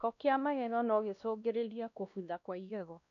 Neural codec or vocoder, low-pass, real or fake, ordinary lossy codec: codec, 16 kHz, 1 kbps, X-Codec, WavLM features, trained on Multilingual LibriSpeech; 7.2 kHz; fake; none